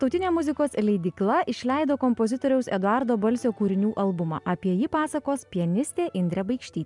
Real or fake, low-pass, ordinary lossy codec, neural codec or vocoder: real; 10.8 kHz; MP3, 96 kbps; none